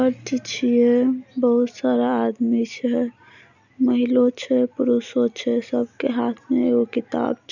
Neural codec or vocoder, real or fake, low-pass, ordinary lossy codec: none; real; 7.2 kHz; none